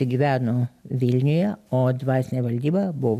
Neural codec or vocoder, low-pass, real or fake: none; 14.4 kHz; real